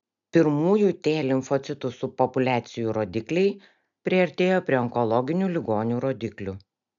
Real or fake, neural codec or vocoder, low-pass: real; none; 7.2 kHz